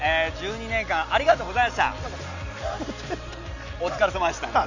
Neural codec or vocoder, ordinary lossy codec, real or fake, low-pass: none; none; real; 7.2 kHz